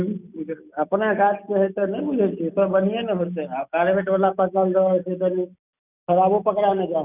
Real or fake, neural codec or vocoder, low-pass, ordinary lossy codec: real; none; 3.6 kHz; none